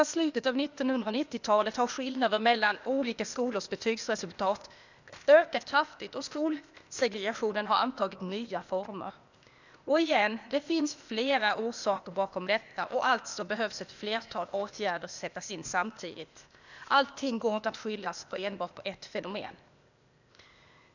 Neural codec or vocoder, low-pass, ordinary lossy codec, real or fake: codec, 16 kHz, 0.8 kbps, ZipCodec; 7.2 kHz; none; fake